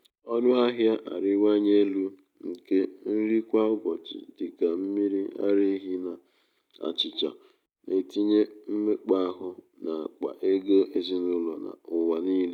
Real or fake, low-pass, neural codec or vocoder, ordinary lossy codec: real; 19.8 kHz; none; none